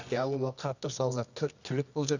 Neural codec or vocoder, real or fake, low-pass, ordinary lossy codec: codec, 24 kHz, 0.9 kbps, WavTokenizer, medium music audio release; fake; 7.2 kHz; none